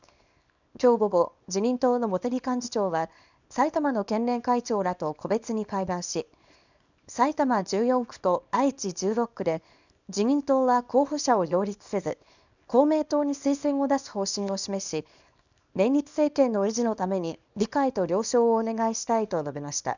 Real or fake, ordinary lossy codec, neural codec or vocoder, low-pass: fake; none; codec, 24 kHz, 0.9 kbps, WavTokenizer, small release; 7.2 kHz